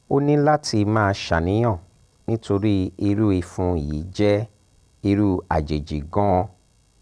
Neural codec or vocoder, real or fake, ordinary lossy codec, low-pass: none; real; none; none